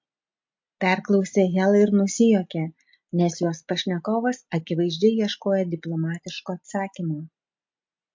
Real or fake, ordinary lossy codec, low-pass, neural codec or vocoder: real; MP3, 48 kbps; 7.2 kHz; none